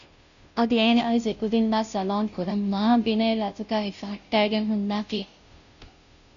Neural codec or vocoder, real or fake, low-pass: codec, 16 kHz, 0.5 kbps, FunCodec, trained on Chinese and English, 25 frames a second; fake; 7.2 kHz